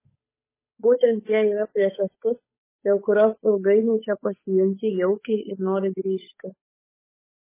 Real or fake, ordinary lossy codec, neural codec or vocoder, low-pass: fake; MP3, 16 kbps; codec, 16 kHz, 8 kbps, FunCodec, trained on Chinese and English, 25 frames a second; 3.6 kHz